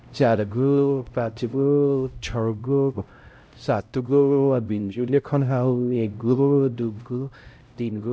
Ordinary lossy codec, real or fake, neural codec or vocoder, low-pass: none; fake; codec, 16 kHz, 0.5 kbps, X-Codec, HuBERT features, trained on LibriSpeech; none